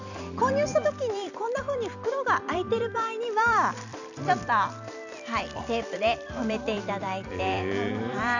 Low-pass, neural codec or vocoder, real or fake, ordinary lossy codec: 7.2 kHz; vocoder, 44.1 kHz, 128 mel bands every 256 samples, BigVGAN v2; fake; none